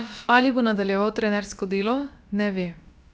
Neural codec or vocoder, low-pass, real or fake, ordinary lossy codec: codec, 16 kHz, about 1 kbps, DyCAST, with the encoder's durations; none; fake; none